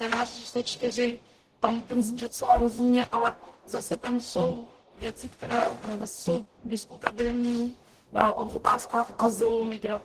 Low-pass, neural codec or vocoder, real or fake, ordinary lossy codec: 14.4 kHz; codec, 44.1 kHz, 0.9 kbps, DAC; fake; Opus, 16 kbps